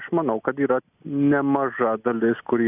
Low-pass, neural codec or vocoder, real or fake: 3.6 kHz; none; real